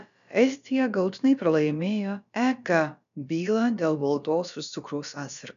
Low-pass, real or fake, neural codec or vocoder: 7.2 kHz; fake; codec, 16 kHz, about 1 kbps, DyCAST, with the encoder's durations